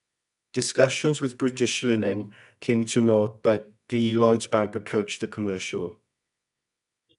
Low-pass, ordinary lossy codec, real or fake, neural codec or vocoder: 10.8 kHz; none; fake; codec, 24 kHz, 0.9 kbps, WavTokenizer, medium music audio release